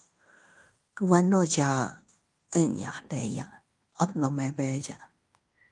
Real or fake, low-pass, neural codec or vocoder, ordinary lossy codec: fake; 10.8 kHz; codec, 16 kHz in and 24 kHz out, 0.9 kbps, LongCat-Audio-Codec, fine tuned four codebook decoder; Opus, 32 kbps